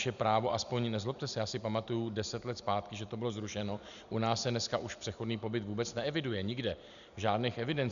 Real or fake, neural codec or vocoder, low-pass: real; none; 7.2 kHz